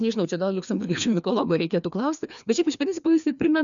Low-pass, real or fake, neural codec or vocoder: 7.2 kHz; fake; codec, 16 kHz, 2 kbps, FreqCodec, larger model